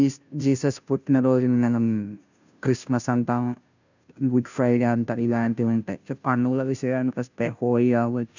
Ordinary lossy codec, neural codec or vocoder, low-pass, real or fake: none; codec, 16 kHz, 0.5 kbps, FunCodec, trained on Chinese and English, 25 frames a second; 7.2 kHz; fake